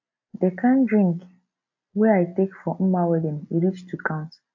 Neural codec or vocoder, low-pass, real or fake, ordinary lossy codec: none; 7.2 kHz; real; AAC, 48 kbps